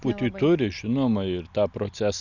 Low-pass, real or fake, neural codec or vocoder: 7.2 kHz; real; none